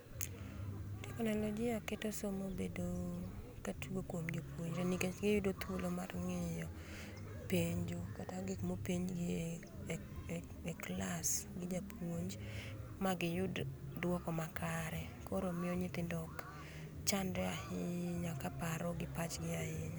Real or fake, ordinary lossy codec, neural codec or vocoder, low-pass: real; none; none; none